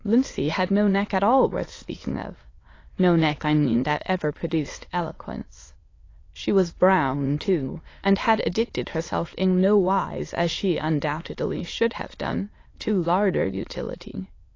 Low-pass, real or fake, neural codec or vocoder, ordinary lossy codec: 7.2 kHz; fake; autoencoder, 22.05 kHz, a latent of 192 numbers a frame, VITS, trained on many speakers; AAC, 32 kbps